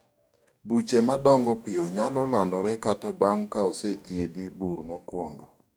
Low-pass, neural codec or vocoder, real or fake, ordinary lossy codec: none; codec, 44.1 kHz, 2.6 kbps, DAC; fake; none